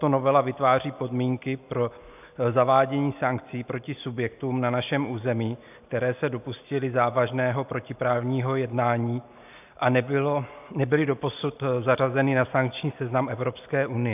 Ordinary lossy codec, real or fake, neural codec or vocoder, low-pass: AAC, 32 kbps; real; none; 3.6 kHz